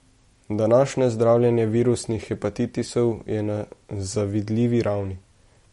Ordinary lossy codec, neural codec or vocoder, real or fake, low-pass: MP3, 48 kbps; none; real; 19.8 kHz